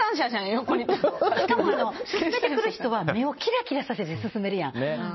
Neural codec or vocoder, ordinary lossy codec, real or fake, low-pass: none; MP3, 24 kbps; real; 7.2 kHz